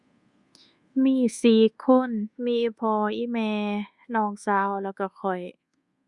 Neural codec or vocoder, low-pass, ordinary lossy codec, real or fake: codec, 24 kHz, 1.2 kbps, DualCodec; 10.8 kHz; Opus, 64 kbps; fake